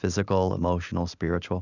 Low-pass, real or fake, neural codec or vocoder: 7.2 kHz; real; none